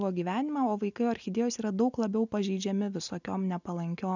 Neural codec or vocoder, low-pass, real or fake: none; 7.2 kHz; real